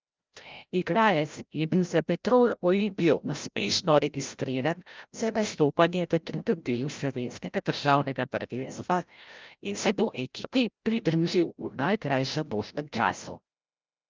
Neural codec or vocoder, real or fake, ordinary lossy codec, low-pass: codec, 16 kHz, 0.5 kbps, FreqCodec, larger model; fake; Opus, 24 kbps; 7.2 kHz